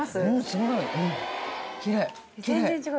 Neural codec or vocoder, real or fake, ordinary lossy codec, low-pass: none; real; none; none